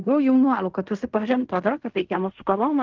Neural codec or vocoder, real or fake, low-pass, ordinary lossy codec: codec, 16 kHz in and 24 kHz out, 0.4 kbps, LongCat-Audio-Codec, fine tuned four codebook decoder; fake; 7.2 kHz; Opus, 24 kbps